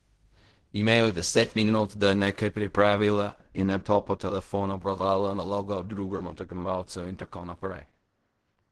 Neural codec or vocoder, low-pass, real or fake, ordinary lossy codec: codec, 16 kHz in and 24 kHz out, 0.4 kbps, LongCat-Audio-Codec, fine tuned four codebook decoder; 10.8 kHz; fake; Opus, 16 kbps